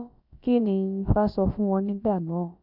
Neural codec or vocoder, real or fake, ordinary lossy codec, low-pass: codec, 16 kHz, about 1 kbps, DyCAST, with the encoder's durations; fake; none; 5.4 kHz